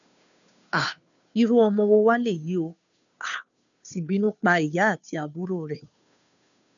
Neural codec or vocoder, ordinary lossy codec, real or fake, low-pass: codec, 16 kHz, 2 kbps, FunCodec, trained on Chinese and English, 25 frames a second; none; fake; 7.2 kHz